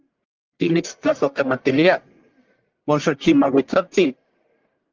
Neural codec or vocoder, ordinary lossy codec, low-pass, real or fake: codec, 44.1 kHz, 1.7 kbps, Pupu-Codec; Opus, 24 kbps; 7.2 kHz; fake